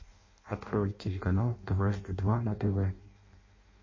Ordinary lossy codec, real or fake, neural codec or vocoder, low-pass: MP3, 32 kbps; fake; codec, 16 kHz in and 24 kHz out, 0.6 kbps, FireRedTTS-2 codec; 7.2 kHz